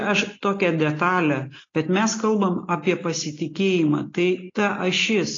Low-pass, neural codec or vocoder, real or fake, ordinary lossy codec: 7.2 kHz; none; real; AAC, 32 kbps